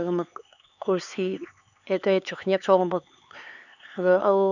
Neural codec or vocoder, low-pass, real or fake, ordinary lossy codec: codec, 16 kHz, 4 kbps, X-Codec, HuBERT features, trained on LibriSpeech; 7.2 kHz; fake; none